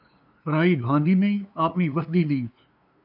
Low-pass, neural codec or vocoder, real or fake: 5.4 kHz; codec, 16 kHz, 2 kbps, FunCodec, trained on LibriTTS, 25 frames a second; fake